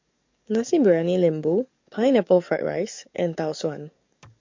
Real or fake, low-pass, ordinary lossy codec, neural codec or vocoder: fake; 7.2 kHz; MP3, 48 kbps; codec, 44.1 kHz, 7.8 kbps, DAC